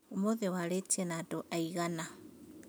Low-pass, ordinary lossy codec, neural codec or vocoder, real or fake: none; none; none; real